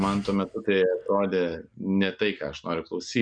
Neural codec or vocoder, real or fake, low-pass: none; real; 9.9 kHz